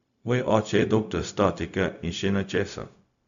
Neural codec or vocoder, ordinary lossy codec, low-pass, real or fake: codec, 16 kHz, 0.4 kbps, LongCat-Audio-Codec; none; 7.2 kHz; fake